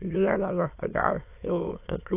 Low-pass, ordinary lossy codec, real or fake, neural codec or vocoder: 3.6 kHz; AAC, 24 kbps; fake; autoencoder, 22.05 kHz, a latent of 192 numbers a frame, VITS, trained on many speakers